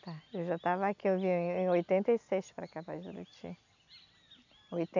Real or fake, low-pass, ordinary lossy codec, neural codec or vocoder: real; 7.2 kHz; none; none